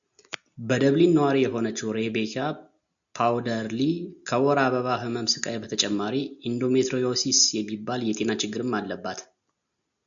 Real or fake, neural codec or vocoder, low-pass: real; none; 7.2 kHz